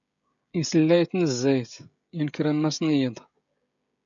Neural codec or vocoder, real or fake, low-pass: codec, 16 kHz, 16 kbps, FreqCodec, smaller model; fake; 7.2 kHz